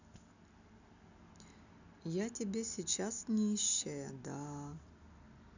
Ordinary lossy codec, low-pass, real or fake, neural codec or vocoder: none; 7.2 kHz; real; none